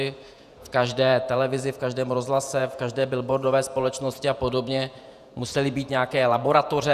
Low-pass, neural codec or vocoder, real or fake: 14.4 kHz; vocoder, 48 kHz, 128 mel bands, Vocos; fake